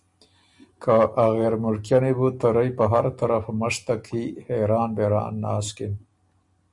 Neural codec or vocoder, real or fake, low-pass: none; real; 10.8 kHz